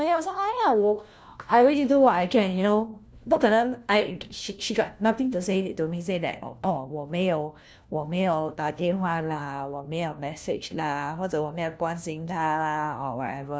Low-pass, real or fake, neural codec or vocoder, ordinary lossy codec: none; fake; codec, 16 kHz, 1 kbps, FunCodec, trained on LibriTTS, 50 frames a second; none